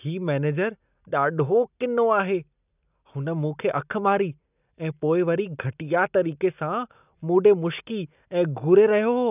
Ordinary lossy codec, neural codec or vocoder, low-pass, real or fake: none; none; 3.6 kHz; real